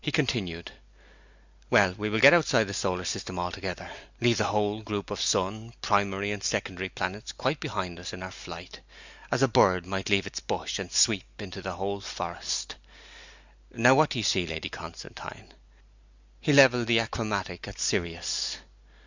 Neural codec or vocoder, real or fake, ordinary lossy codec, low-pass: none; real; Opus, 64 kbps; 7.2 kHz